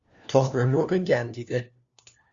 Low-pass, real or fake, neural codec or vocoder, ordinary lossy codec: 7.2 kHz; fake; codec, 16 kHz, 1 kbps, FunCodec, trained on LibriTTS, 50 frames a second; Opus, 64 kbps